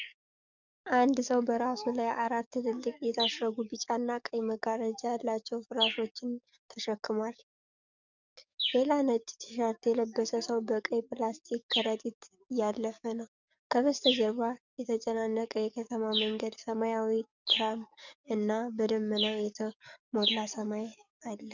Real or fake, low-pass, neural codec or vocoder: fake; 7.2 kHz; codec, 44.1 kHz, 7.8 kbps, DAC